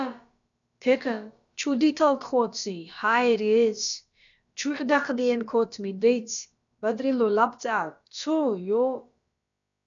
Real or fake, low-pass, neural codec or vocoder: fake; 7.2 kHz; codec, 16 kHz, about 1 kbps, DyCAST, with the encoder's durations